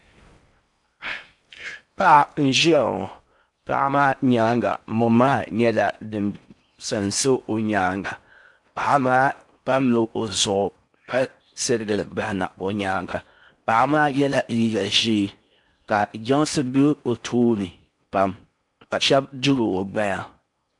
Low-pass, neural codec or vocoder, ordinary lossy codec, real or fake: 10.8 kHz; codec, 16 kHz in and 24 kHz out, 0.8 kbps, FocalCodec, streaming, 65536 codes; MP3, 64 kbps; fake